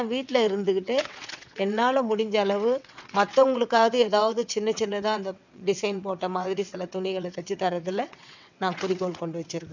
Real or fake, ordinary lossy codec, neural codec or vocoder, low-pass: fake; none; vocoder, 22.05 kHz, 80 mel bands, WaveNeXt; 7.2 kHz